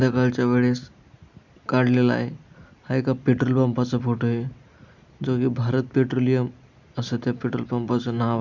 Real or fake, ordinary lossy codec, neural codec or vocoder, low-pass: real; none; none; 7.2 kHz